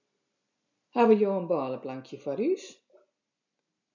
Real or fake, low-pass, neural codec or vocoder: real; 7.2 kHz; none